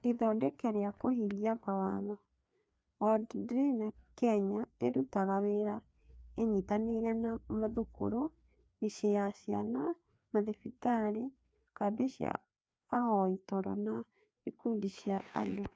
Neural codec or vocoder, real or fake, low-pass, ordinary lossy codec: codec, 16 kHz, 2 kbps, FreqCodec, larger model; fake; none; none